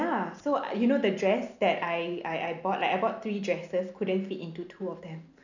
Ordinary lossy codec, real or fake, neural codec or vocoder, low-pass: none; real; none; 7.2 kHz